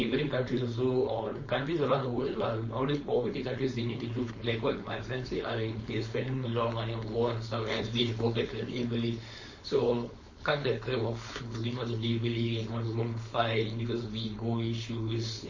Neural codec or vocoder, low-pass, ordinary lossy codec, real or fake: codec, 16 kHz, 4.8 kbps, FACodec; 7.2 kHz; MP3, 32 kbps; fake